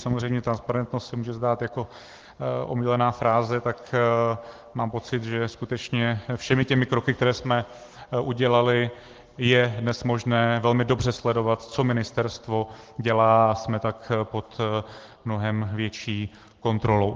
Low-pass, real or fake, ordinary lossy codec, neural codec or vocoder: 7.2 kHz; real; Opus, 16 kbps; none